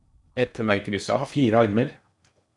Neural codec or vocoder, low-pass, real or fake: codec, 16 kHz in and 24 kHz out, 0.8 kbps, FocalCodec, streaming, 65536 codes; 10.8 kHz; fake